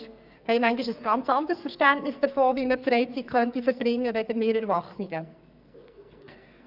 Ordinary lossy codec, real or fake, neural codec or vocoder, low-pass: none; fake; codec, 44.1 kHz, 2.6 kbps, SNAC; 5.4 kHz